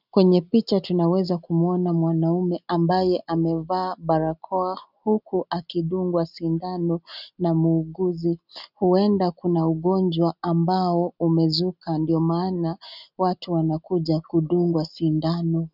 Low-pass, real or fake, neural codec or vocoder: 5.4 kHz; real; none